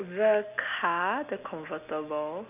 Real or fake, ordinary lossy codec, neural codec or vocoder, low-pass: real; none; none; 3.6 kHz